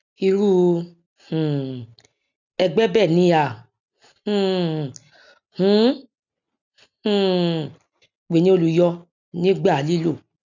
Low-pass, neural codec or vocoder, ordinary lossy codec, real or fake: 7.2 kHz; none; none; real